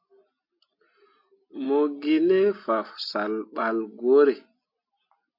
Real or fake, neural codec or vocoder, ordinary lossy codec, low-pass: real; none; MP3, 32 kbps; 5.4 kHz